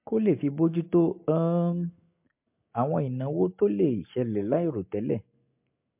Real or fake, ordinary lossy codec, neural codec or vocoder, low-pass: real; MP3, 32 kbps; none; 3.6 kHz